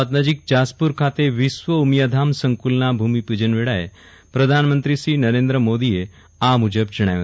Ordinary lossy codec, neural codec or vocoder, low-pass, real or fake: none; none; none; real